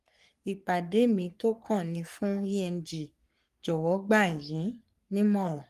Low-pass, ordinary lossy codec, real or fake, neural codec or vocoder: 14.4 kHz; Opus, 32 kbps; fake; codec, 44.1 kHz, 3.4 kbps, Pupu-Codec